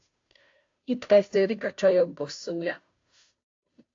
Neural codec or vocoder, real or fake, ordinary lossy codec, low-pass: codec, 16 kHz, 0.5 kbps, FunCodec, trained on Chinese and English, 25 frames a second; fake; AAC, 48 kbps; 7.2 kHz